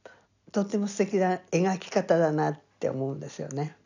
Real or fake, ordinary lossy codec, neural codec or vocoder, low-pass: real; none; none; 7.2 kHz